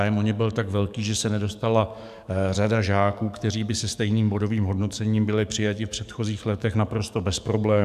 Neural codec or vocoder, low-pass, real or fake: codec, 44.1 kHz, 7.8 kbps, DAC; 14.4 kHz; fake